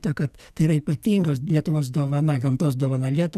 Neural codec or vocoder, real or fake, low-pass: codec, 44.1 kHz, 2.6 kbps, SNAC; fake; 14.4 kHz